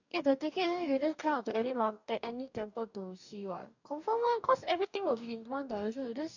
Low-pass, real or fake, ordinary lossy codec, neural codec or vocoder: 7.2 kHz; fake; none; codec, 44.1 kHz, 2.6 kbps, DAC